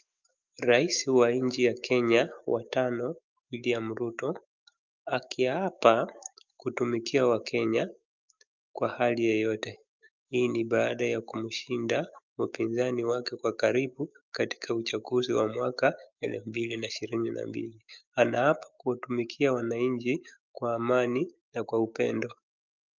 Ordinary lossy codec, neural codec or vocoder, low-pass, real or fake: Opus, 24 kbps; autoencoder, 48 kHz, 128 numbers a frame, DAC-VAE, trained on Japanese speech; 7.2 kHz; fake